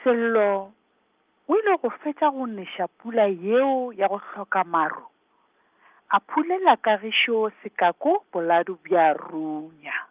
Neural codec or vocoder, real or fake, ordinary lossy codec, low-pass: none; real; Opus, 24 kbps; 3.6 kHz